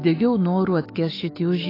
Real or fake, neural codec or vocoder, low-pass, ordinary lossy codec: fake; autoencoder, 48 kHz, 128 numbers a frame, DAC-VAE, trained on Japanese speech; 5.4 kHz; AAC, 24 kbps